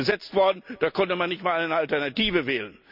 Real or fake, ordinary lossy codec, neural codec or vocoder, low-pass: real; none; none; 5.4 kHz